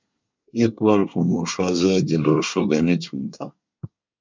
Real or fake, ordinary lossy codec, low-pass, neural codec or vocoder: fake; MP3, 64 kbps; 7.2 kHz; codec, 24 kHz, 1 kbps, SNAC